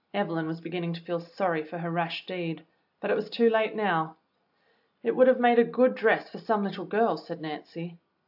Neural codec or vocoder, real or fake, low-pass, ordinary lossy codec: none; real; 5.4 kHz; AAC, 48 kbps